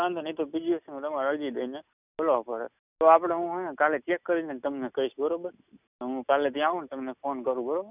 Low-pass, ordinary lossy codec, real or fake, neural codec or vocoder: 3.6 kHz; none; real; none